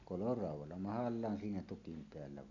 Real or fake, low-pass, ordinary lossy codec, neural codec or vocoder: real; 7.2 kHz; none; none